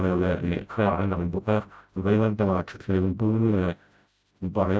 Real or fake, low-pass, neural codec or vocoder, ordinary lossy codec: fake; none; codec, 16 kHz, 0.5 kbps, FreqCodec, smaller model; none